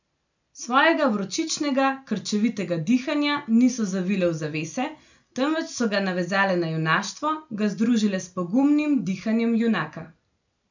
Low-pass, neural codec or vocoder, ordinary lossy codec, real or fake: 7.2 kHz; none; none; real